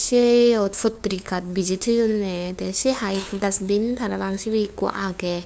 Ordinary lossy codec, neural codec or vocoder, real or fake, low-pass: none; codec, 16 kHz, 2 kbps, FunCodec, trained on LibriTTS, 25 frames a second; fake; none